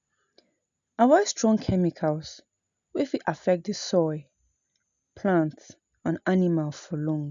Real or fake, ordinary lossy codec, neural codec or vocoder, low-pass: real; none; none; 7.2 kHz